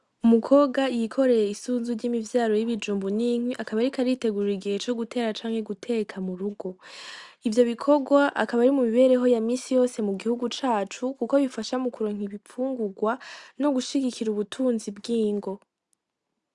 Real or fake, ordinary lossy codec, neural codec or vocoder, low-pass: real; Opus, 64 kbps; none; 10.8 kHz